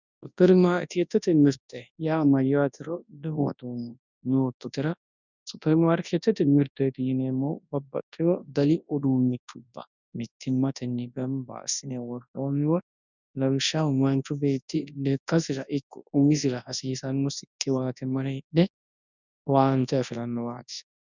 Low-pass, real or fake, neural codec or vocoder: 7.2 kHz; fake; codec, 24 kHz, 0.9 kbps, WavTokenizer, large speech release